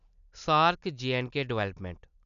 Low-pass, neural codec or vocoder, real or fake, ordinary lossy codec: 7.2 kHz; none; real; none